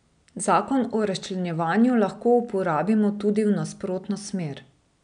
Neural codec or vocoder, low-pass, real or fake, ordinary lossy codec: none; 9.9 kHz; real; none